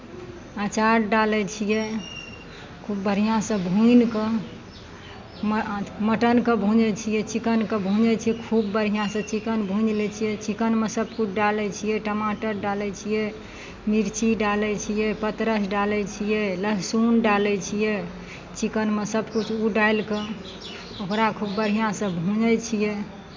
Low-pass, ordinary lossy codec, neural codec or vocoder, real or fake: 7.2 kHz; MP3, 64 kbps; none; real